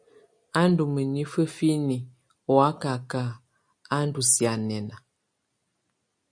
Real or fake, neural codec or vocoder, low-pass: real; none; 9.9 kHz